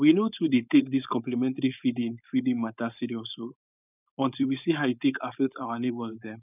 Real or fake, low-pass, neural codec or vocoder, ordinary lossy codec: fake; 3.6 kHz; codec, 16 kHz, 4.8 kbps, FACodec; none